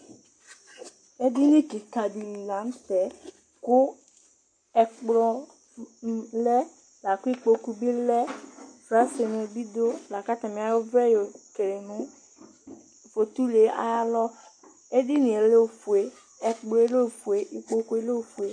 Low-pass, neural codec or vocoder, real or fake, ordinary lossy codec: 9.9 kHz; none; real; MP3, 48 kbps